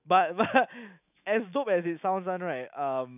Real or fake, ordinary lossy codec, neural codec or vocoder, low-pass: fake; none; autoencoder, 48 kHz, 128 numbers a frame, DAC-VAE, trained on Japanese speech; 3.6 kHz